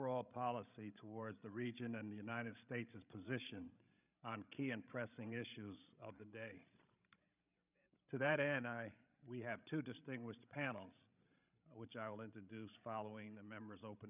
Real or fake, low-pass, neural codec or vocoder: fake; 3.6 kHz; codec, 16 kHz, 16 kbps, FreqCodec, larger model